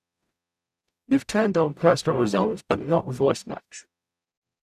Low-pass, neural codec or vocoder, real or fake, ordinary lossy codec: 14.4 kHz; codec, 44.1 kHz, 0.9 kbps, DAC; fake; none